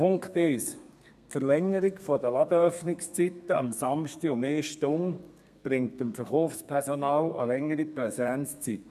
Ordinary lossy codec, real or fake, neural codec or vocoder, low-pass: none; fake; codec, 32 kHz, 1.9 kbps, SNAC; 14.4 kHz